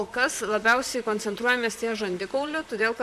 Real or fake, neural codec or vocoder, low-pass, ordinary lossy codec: fake; vocoder, 44.1 kHz, 128 mel bands, Pupu-Vocoder; 14.4 kHz; MP3, 96 kbps